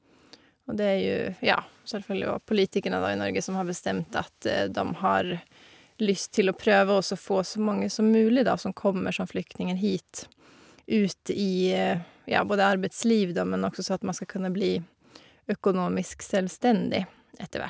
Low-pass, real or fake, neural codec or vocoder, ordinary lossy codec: none; real; none; none